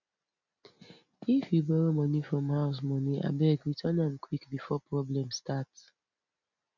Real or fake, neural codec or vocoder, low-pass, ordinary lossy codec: real; none; 7.2 kHz; none